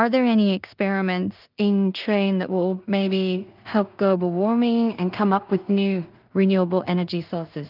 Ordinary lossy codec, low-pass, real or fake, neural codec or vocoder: Opus, 24 kbps; 5.4 kHz; fake; codec, 16 kHz in and 24 kHz out, 0.4 kbps, LongCat-Audio-Codec, two codebook decoder